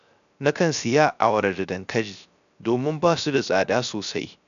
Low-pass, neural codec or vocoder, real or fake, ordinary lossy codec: 7.2 kHz; codec, 16 kHz, 0.3 kbps, FocalCodec; fake; none